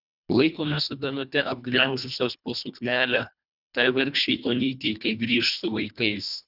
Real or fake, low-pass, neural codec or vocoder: fake; 5.4 kHz; codec, 24 kHz, 1.5 kbps, HILCodec